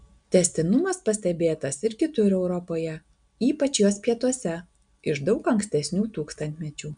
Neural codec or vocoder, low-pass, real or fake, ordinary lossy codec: none; 9.9 kHz; real; MP3, 96 kbps